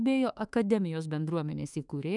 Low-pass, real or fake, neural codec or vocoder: 10.8 kHz; fake; autoencoder, 48 kHz, 32 numbers a frame, DAC-VAE, trained on Japanese speech